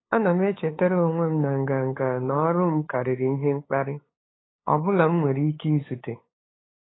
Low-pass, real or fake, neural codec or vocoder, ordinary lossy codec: 7.2 kHz; fake; codec, 16 kHz, 8 kbps, FunCodec, trained on LibriTTS, 25 frames a second; AAC, 16 kbps